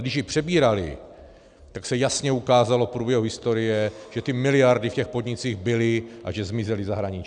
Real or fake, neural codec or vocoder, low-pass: real; none; 9.9 kHz